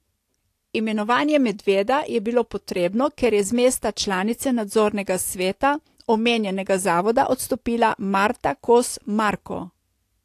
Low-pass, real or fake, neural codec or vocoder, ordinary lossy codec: 14.4 kHz; real; none; AAC, 64 kbps